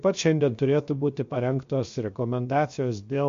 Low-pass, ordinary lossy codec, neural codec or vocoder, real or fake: 7.2 kHz; MP3, 48 kbps; codec, 16 kHz, 0.7 kbps, FocalCodec; fake